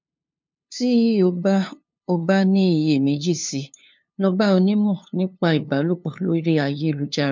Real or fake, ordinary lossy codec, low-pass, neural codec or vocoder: fake; MP3, 64 kbps; 7.2 kHz; codec, 16 kHz, 2 kbps, FunCodec, trained on LibriTTS, 25 frames a second